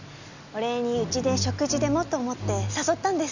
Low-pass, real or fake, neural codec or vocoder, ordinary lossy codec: 7.2 kHz; real; none; none